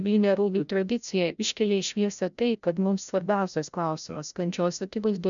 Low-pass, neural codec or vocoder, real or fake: 7.2 kHz; codec, 16 kHz, 0.5 kbps, FreqCodec, larger model; fake